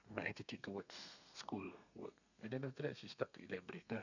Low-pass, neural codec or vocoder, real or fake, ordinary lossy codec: 7.2 kHz; codec, 32 kHz, 1.9 kbps, SNAC; fake; none